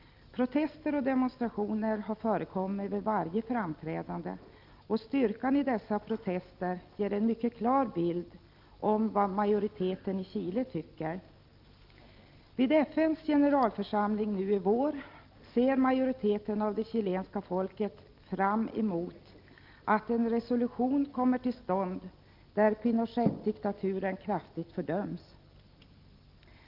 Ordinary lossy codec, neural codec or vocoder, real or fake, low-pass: Opus, 32 kbps; none; real; 5.4 kHz